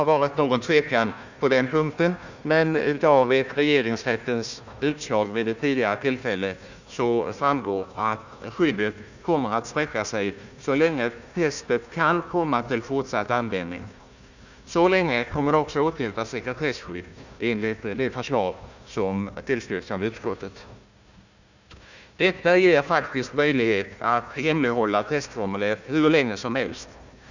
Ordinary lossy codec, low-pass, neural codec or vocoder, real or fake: none; 7.2 kHz; codec, 16 kHz, 1 kbps, FunCodec, trained on Chinese and English, 50 frames a second; fake